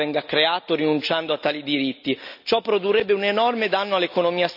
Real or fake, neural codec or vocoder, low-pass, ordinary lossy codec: real; none; 5.4 kHz; none